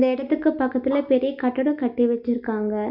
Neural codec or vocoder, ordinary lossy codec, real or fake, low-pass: none; none; real; 5.4 kHz